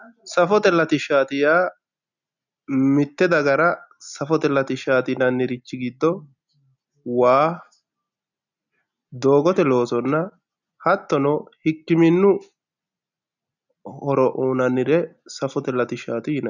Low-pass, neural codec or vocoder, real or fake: 7.2 kHz; none; real